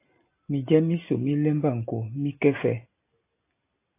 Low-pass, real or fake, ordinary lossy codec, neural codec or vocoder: 3.6 kHz; real; AAC, 24 kbps; none